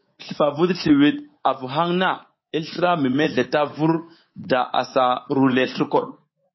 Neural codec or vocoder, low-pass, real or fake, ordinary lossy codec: codec, 24 kHz, 3.1 kbps, DualCodec; 7.2 kHz; fake; MP3, 24 kbps